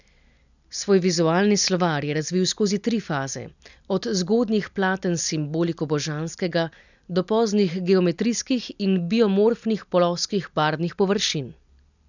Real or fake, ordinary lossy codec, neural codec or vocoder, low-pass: real; none; none; 7.2 kHz